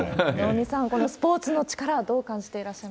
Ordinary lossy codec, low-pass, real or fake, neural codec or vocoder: none; none; real; none